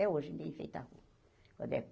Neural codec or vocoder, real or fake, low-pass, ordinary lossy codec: none; real; none; none